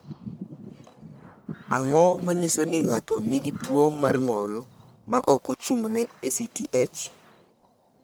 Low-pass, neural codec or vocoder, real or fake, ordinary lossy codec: none; codec, 44.1 kHz, 1.7 kbps, Pupu-Codec; fake; none